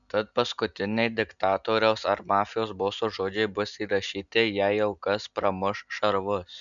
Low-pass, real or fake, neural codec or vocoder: 7.2 kHz; real; none